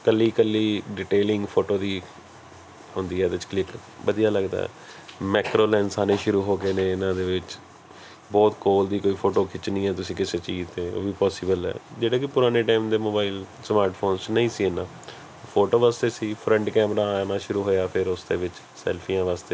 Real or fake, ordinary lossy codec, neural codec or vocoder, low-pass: real; none; none; none